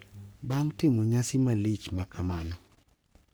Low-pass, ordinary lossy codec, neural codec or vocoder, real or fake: none; none; codec, 44.1 kHz, 3.4 kbps, Pupu-Codec; fake